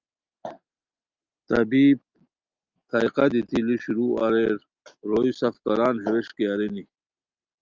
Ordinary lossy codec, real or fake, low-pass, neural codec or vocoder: Opus, 24 kbps; real; 7.2 kHz; none